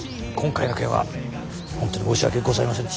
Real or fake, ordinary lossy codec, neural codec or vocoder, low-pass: real; none; none; none